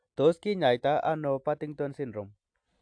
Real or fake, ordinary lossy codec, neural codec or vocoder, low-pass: real; AAC, 64 kbps; none; 9.9 kHz